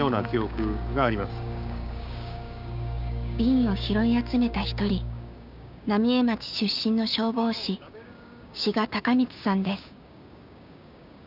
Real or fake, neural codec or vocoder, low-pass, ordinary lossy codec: fake; codec, 16 kHz, 6 kbps, DAC; 5.4 kHz; none